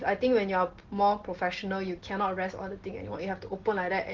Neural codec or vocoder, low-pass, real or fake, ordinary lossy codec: none; 7.2 kHz; real; Opus, 16 kbps